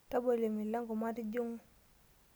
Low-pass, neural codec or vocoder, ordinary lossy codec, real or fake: none; none; none; real